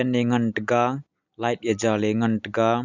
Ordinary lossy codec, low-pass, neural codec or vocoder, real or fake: none; 7.2 kHz; none; real